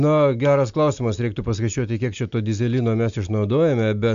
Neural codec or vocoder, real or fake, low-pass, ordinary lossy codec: none; real; 7.2 kHz; MP3, 64 kbps